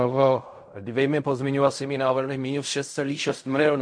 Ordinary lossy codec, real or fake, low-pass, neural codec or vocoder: MP3, 48 kbps; fake; 9.9 kHz; codec, 16 kHz in and 24 kHz out, 0.4 kbps, LongCat-Audio-Codec, fine tuned four codebook decoder